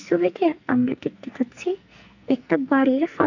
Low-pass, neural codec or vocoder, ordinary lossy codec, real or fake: 7.2 kHz; codec, 44.1 kHz, 2.6 kbps, SNAC; none; fake